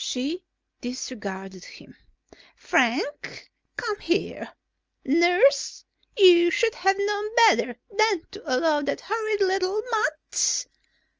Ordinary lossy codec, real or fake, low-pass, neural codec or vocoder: Opus, 24 kbps; real; 7.2 kHz; none